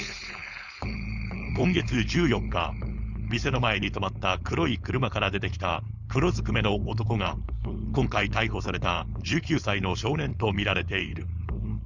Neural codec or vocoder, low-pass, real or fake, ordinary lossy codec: codec, 16 kHz, 4.8 kbps, FACodec; 7.2 kHz; fake; Opus, 64 kbps